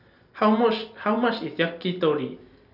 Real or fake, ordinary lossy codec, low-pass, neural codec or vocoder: real; none; 5.4 kHz; none